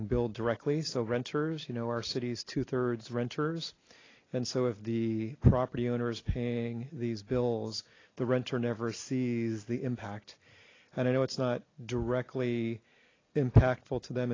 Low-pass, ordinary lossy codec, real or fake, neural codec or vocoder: 7.2 kHz; AAC, 32 kbps; real; none